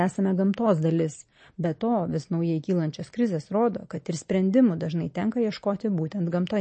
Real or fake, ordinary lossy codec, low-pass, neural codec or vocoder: real; MP3, 32 kbps; 10.8 kHz; none